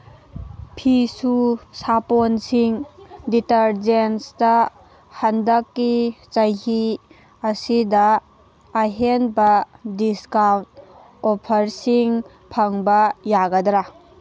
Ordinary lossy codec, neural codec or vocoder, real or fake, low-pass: none; none; real; none